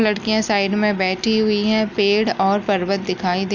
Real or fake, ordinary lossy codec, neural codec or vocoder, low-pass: real; none; none; 7.2 kHz